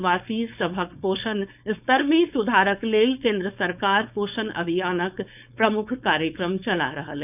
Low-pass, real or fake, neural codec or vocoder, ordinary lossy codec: 3.6 kHz; fake; codec, 16 kHz, 4.8 kbps, FACodec; none